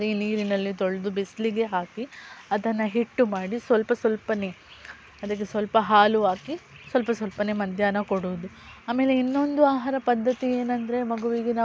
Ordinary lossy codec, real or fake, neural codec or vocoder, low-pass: none; real; none; none